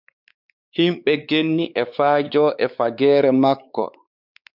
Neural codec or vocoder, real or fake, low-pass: codec, 16 kHz, 4 kbps, X-Codec, WavLM features, trained on Multilingual LibriSpeech; fake; 5.4 kHz